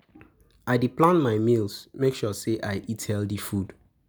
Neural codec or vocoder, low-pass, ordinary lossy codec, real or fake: none; none; none; real